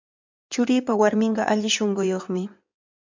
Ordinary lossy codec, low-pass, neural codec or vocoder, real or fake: MP3, 64 kbps; 7.2 kHz; codec, 16 kHz in and 24 kHz out, 2.2 kbps, FireRedTTS-2 codec; fake